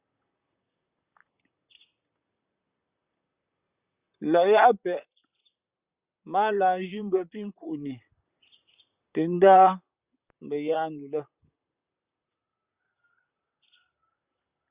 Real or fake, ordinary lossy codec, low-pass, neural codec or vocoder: fake; Opus, 64 kbps; 3.6 kHz; vocoder, 44.1 kHz, 128 mel bands, Pupu-Vocoder